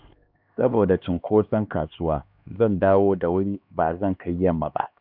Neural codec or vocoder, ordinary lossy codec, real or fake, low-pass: codec, 16 kHz, 2 kbps, X-Codec, HuBERT features, trained on LibriSpeech; none; fake; 5.4 kHz